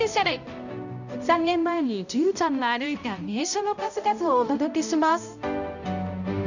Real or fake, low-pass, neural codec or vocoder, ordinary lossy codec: fake; 7.2 kHz; codec, 16 kHz, 0.5 kbps, X-Codec, HuBERT features, trained on balanced general audio; none